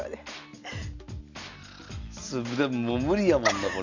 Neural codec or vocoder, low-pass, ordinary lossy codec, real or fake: none; 7.2 kHz; none; real